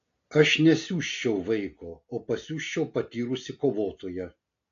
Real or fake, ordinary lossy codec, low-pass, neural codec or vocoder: real; AAC, 48 kbps; 7.2 kHz; none